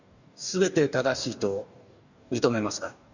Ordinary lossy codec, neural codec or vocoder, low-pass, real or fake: none; codec, 44.1 kHz, 2.6 kbps, DAC; 7.2 kHz; fake